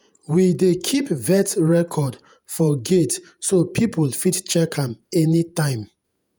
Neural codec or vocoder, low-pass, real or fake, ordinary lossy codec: vocoder, 48 kHz, 128 mel bands, Vocos; none; fake; none